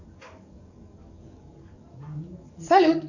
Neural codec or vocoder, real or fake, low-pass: codec, 44.1 kHz, 7.8 kbps, DAC; fake; 7.2 kHz